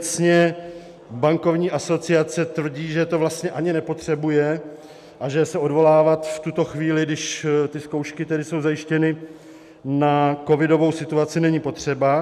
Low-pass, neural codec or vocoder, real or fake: 14.4 kHz; none; real